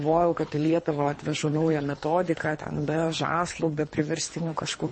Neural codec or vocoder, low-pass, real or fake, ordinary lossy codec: codec, 24 kHz, 3 kbps, HILCodec; 9.9 kHz; fake; MP3, 32 kbps